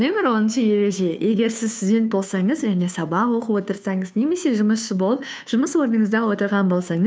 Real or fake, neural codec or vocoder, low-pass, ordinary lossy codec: fake; codec, 16 kHz, 2 kbps, FunCodec, trained on Chinese and English, 25 frames a second; none; none